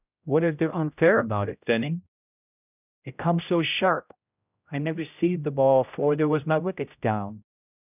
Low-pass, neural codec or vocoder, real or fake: 3.6 kHz; codec, 16 kHz, 0.5 kbps, X-Codec, HuBERT features, trained on general audio; fake